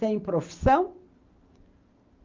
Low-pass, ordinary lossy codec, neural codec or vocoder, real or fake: 7.2 kHz; Opus, 24 kbps; none; real